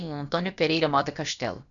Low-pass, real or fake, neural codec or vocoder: 7.2 kHz; fake; codec, 16 kHz, about 1 kbps, DyCAST, with the encoder's durations